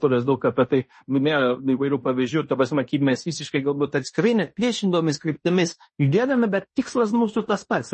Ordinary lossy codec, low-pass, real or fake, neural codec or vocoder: MP3, 32 kbps; 10.8 kHz; fake; codec, 16 kHz in and 24 kHz out, 0.9 kbps, LongCat-Audio-Codec, fine tuned four codebook decoder